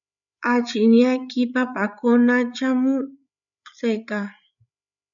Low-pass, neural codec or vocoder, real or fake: 7.2 kHz; codec, 16 kHz, 8 kbps, FreqCodec, larger model; fake